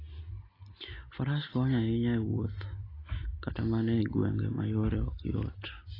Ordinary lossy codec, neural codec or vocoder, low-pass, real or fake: AAC, 24 kbps; none; 5.4 kHz; real